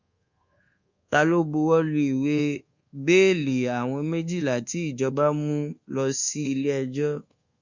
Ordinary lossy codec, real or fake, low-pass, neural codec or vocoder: Opus, 64 kbps; fake; 7.2 kHz; codec, 24 kHz, 1.2 kbps, DualCodec